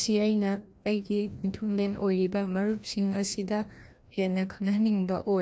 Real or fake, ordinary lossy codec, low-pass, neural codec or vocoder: fake; none; none; codec, 16 kHz, 1 kbps, FreqCodec, larger model